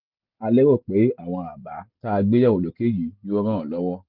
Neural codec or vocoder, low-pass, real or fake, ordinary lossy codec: none; 5.4 kHz; real; none